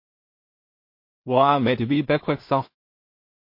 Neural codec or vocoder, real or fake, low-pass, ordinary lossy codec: codec, 16 kHz in and 24 kHz out, 0.4 kbps, LongCat-Audio-Codec, two codebook decoder; fake; 5.4 kHz; MP3, 32 kbps